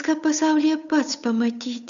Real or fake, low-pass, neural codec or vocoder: fake; 7.2 kHz; codec, 16 kHz, 8 kbps, FunCodec, trained on Chinese and English, 25 frames a second